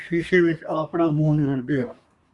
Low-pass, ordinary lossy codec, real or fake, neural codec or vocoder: 10.8 kHz; Opus, 64 kbps; fake; codec, 24 kHz, 1 kbps, SNAC